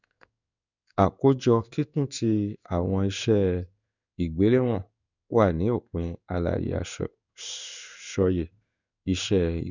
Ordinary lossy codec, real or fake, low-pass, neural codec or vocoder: none; fake; 7.2 kHz; codec, 16 kHz in and 24 kHz out, 1 kbps, XY-Tokenizer